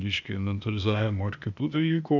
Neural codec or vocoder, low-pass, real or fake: codec, 16 kHz, 0.8 kbps, ZipCodec; 7.2 kHz; fake